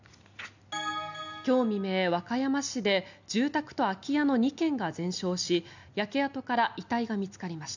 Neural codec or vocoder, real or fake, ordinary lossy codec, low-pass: none; real; none; 7.2 kHz